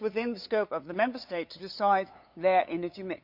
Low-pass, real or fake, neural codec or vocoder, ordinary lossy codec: 5.4 kHz; fake; codec, 16 kHz, 8 kbps, FunCodec, trained on LibriTTS, 25 frames a second; Opus, 64 kbps